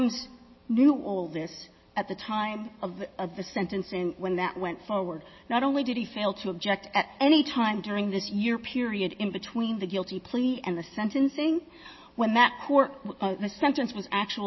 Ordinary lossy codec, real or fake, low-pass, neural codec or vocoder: MP3, 24 kbps; real; 7.2 kHz; none